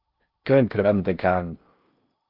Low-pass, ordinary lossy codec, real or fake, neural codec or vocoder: 5.4 kHz; Opus, 32 kbps; fake; codec, 16 kHz in and 24 kHz out, 0.8 kbps, FocalCodec, streaming, 65536 codes